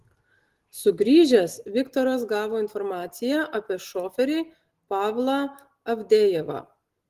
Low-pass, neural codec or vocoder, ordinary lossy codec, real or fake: 14.4 kHz; none; Opus, 16 kbps; real